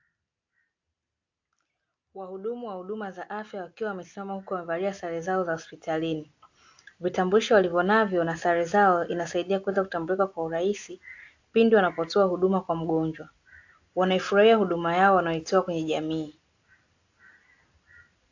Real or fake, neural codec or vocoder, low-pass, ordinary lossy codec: real; none; 7.2 kHz; AAC, 48 kbps